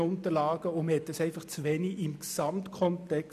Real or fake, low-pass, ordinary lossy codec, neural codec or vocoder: real; 14.4 kHz; none; none